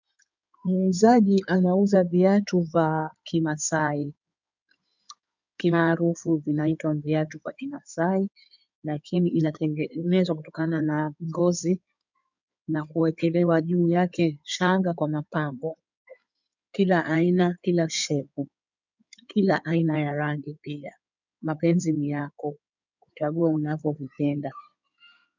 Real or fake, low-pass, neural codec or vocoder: fake; 7.2 kHz; codec, 16 kHz in and 24 kHz out, 2.2 kbps, FireRedTTS-2 codec